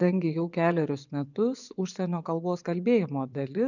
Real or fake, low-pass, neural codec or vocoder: fake; 7.2 kHz; vocoder, 24 kHz, 100 mel bands, Vocos